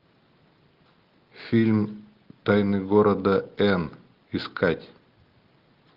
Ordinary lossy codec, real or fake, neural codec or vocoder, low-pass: Opus, 32 kbps; real; none; 5.4 kHz